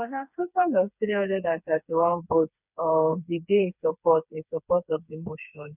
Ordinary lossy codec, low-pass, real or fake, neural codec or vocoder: none; 3.6 kHz; fake; codec, 16 kHz, 4 kbps, FreqCodec, smaller model